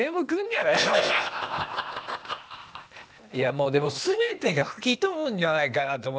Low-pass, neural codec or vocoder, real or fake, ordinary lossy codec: none; codec, 16 kHz, 0.8 kbps, ZipCodec; fake; none